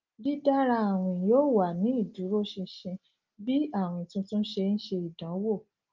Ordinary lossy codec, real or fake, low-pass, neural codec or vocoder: Opus, 24 kbps; real; 7.2 kHz; none